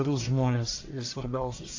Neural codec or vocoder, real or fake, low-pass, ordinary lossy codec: codec, 44.1 kHz, 1.7 kbps, Pupu-Codec; fake; 7.2 kHz; AAC, 48 kbps